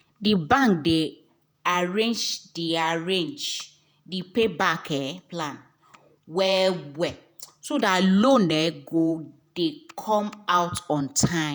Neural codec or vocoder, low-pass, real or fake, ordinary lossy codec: vocoder, 48 kHz, 128 mel bands, Vocos; none; fake; none